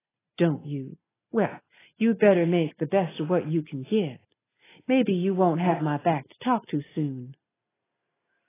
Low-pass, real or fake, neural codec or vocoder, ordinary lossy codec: 3.6 kHz; real; none; AAC, 16 kbps